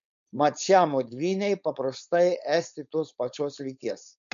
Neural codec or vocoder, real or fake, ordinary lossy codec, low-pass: codec, 16 kHz, 4.8 kbps, FACodec; fake; MP3, 64 kbps; 7.2 kHz